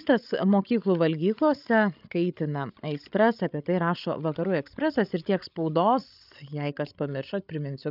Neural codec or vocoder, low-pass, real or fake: codec, 16 kHz, 16 kbps, FreqCodec, larger model; 5.4 kHz; fake